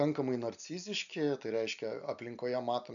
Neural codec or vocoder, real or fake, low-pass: none; real; 7.2 kHz